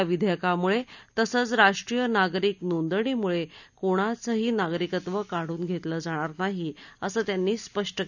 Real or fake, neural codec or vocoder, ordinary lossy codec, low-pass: real; none; none; 7.2 kHz